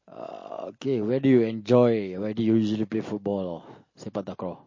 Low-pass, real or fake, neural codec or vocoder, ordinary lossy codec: 7.2 kHz; real; none; MP3, 32 kbps